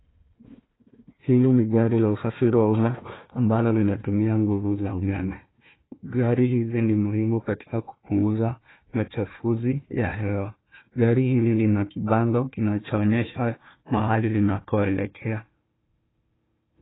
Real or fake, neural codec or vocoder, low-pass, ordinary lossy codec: fake; codec, 16 kHz, 1 kbps, FunCodec, trained on Chinese and English, 50 frames a second; 7.2 kHz; AAC, 16 kbps